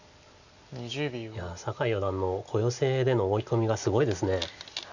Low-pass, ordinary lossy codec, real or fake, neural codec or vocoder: 7.2 kHz; none; real; none